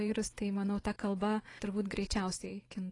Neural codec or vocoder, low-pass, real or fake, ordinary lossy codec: vocoder, 24 kHz, 100 mel bands, Vocos; 10.8 kHz; fake; AAC, 32 kbps